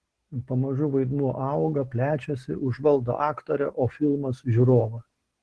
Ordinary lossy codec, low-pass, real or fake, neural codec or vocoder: Opus, 16 kbps; 10.8 kHz; real; none